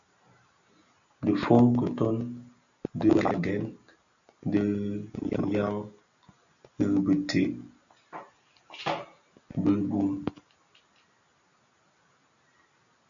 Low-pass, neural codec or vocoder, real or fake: 7.2 kHz; none; real